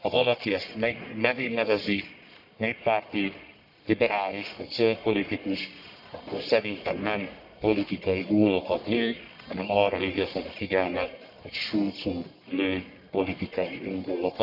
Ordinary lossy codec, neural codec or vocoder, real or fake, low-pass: none; codec, 44.1 kHz, 1.7 kbps, Pupu-Codec; fake; 5.4 kHz